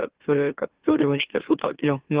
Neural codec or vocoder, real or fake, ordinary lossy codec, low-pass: autoencoder, 44.1 kHz, a latent of 192 numbers a frame, MeloTTS; fake; Opus, 16 kbps; 3.6 kHz